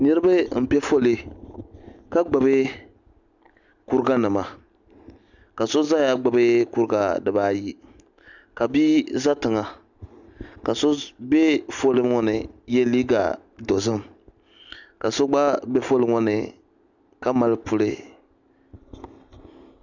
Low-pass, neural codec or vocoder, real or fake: 7.2 kHz; none; real